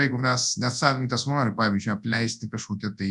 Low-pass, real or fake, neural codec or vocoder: 10.8 kHz; fake; codec, 24 kHz, 0.9 kbps, WavTokenizer, large speech release